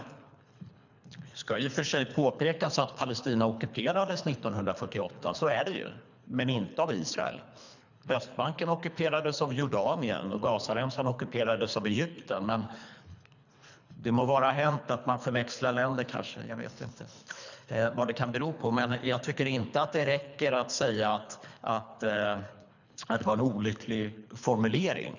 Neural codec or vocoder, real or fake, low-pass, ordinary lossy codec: codec, 24 kHz, 3 kbps, HILCodec; fake; 7.2 kHz; none